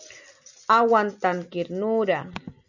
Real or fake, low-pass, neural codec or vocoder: real; 7.2 kHz; none